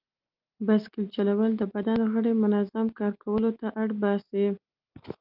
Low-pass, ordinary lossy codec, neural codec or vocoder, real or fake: 5.4 kHz; Opus, 24 kbps; none; real